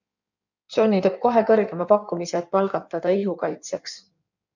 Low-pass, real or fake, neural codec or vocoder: 7.2 kHz; fake; codec, 16 kHz in and 24 kHz out, 1.1 kbps, FireRedTTS-2 codec